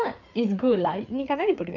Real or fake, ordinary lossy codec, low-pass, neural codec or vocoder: fake; none; 7.2 kHz; codec, 16 kHz, 4 kbps, FreqCodec, larger model